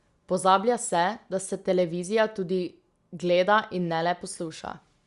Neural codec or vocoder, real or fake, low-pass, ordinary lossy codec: none; real; 10.8 kHz; Opus, 64 kbps